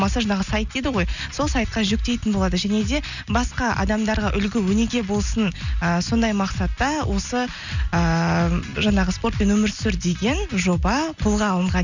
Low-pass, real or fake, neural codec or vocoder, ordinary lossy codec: 7.2 kHz; real; none; none